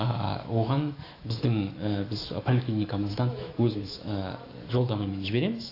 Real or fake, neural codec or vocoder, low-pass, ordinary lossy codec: fake; autoencoder, 48 kHz, 128 numbers a frame, DAC-VAE, trained on Japanese speech; 5.4 kHz; AAC, 32 kbps